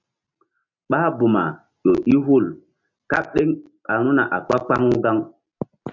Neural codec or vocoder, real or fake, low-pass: none; real; 7.2 kHz